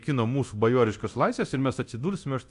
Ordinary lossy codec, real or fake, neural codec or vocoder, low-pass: AAC, 96 kbps; fake; codec, 24 kHz, 0.9 kbps, DualCodec; 10.8 kHz